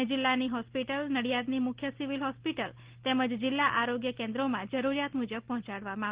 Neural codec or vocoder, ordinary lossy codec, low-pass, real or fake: none; Opus, 16 kbps; 3.6 kHz; real